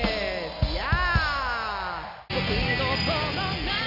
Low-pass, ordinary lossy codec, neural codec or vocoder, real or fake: 5.4 kHz; none; none; real